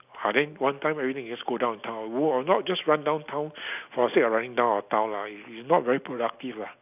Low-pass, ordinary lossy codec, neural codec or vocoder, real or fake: 3.6 kHz; none; none; real